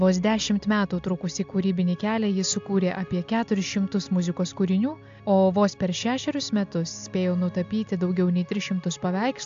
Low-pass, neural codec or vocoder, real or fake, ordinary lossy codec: 7.2 kHz; none; real; AAC, 64 kbps